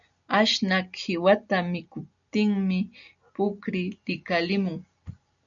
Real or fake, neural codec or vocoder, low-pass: real; none; 7.2 kHz